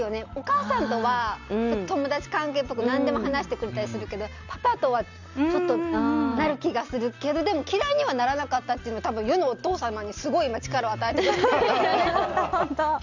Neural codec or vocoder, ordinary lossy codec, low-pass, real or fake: none; Opus, 64 kbps; 7.2 kHz; real